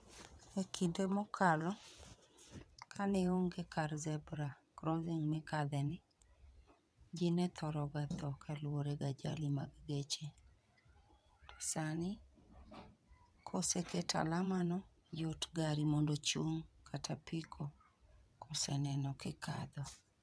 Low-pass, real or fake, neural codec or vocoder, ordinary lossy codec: none; fake; vocoder, 22.05 kHz, 80 mel bands, WaveNeXt; none